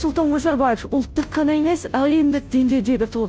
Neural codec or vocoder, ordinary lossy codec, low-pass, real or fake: codec, 16 kHz, 0.5 kbps, FunCodec, trained on Chinese and English, 25 frames a second; none; none; fake